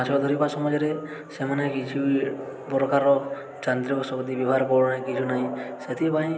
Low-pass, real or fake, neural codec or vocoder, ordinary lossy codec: none; real; none; none